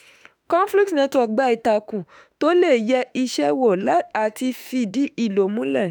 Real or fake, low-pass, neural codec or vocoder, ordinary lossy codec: fake; none; autoencoder, 48 kHz, 32 numbers a frame, DAC-VAE, trained on Japanese speech; none